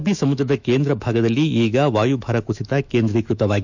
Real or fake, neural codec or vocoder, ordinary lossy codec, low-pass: fake; autoencoder, 48 kHz, 128 numbers a frame, DAC-VAE, trained on Japanese speech; none; 7.2 kHz